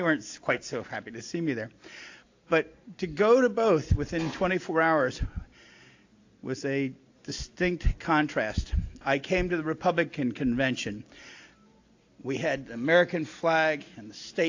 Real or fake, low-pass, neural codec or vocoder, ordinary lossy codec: real; 7.2 kHz; none; AAC, 48 kbps